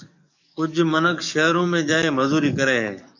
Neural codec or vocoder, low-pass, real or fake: codec, 44.1 kHz, 7.8 kbps, DAC; 7.2 kHz; fake